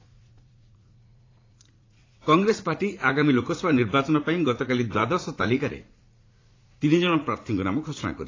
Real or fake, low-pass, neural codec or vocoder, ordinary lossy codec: fake; 7.2 kHz; vocoder, 44.1 kHz, 80 mel bands, Vocos; AAC, 32 kbps